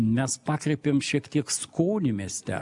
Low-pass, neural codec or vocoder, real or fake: 10.8 kHz; none; real